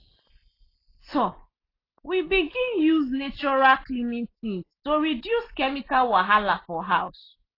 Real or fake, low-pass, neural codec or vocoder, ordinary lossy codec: real; 5.4 kHz; none; AAC, 24 kbps